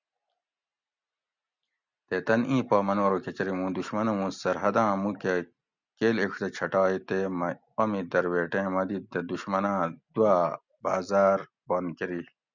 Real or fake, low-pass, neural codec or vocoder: real; 7.2 kHz; none